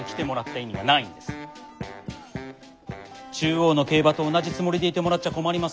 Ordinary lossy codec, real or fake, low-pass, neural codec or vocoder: none; real; none; none